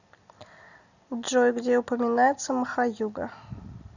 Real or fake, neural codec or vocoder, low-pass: real; none; 7.2 kHz